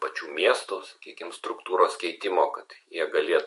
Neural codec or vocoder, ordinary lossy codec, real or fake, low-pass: autoencoder, 48 kHz, 128 numbers a frame, DAC-VAE, trained on Japanese speech; MP3, 48 kbps; fake; 14.4 kHz